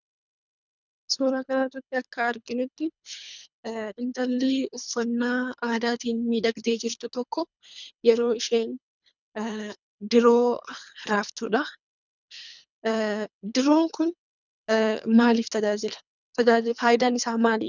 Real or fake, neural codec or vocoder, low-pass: fake; codec, 24 kHz, 3 kbps, HILCodec; 7.2 kHz